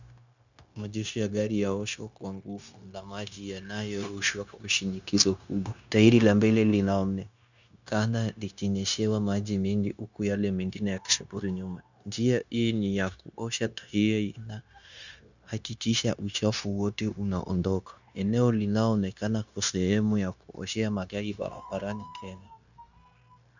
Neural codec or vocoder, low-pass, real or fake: codec, 16 kHz, 0.9 kbps, LongCat-Audio-Codec; 7.2 kHz; fake